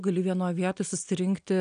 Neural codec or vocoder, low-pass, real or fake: none; 9.9 kHz; real